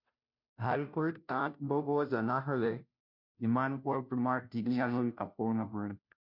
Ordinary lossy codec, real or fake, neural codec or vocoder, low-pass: MP3, 48 kbps; fake; codec, 16 kHz, 0.5 kbps, FunCodec, trained on Chinese and English, 25 frames a second; 5.4 kHz